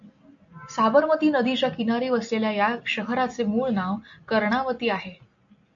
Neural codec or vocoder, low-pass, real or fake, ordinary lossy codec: none; 7.2 kHz; real; AAC, 64 kbps